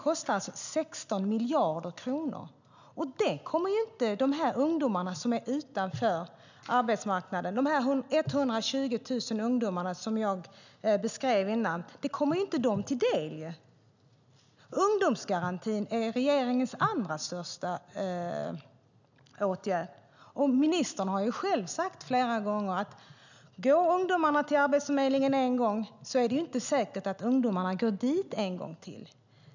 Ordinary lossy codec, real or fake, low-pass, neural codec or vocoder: none; real; 7.2 kHz; none